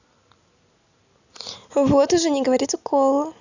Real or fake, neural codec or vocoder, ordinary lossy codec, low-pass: real; none; none; 7.2 kHz